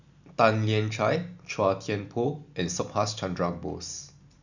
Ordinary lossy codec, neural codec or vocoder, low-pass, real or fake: none; none; 7.2 kHz; real